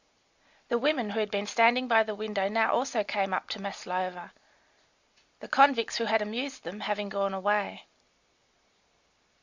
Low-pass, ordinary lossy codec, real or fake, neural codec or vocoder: 7.2 kHz; Opus, 64 kbps; real; none